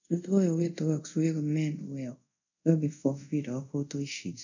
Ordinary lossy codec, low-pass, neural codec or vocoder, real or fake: none; 7.2 kHz; codec, 24 kHz, 0.5 kbps, DualCodec; fake